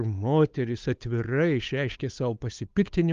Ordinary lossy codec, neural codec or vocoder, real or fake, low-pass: Opus, 32 kbps; codec, 16 kHz, 8 kbps, FunCodec, trained on Chinese and English, 25 frames a second; fake; 7.2 kHz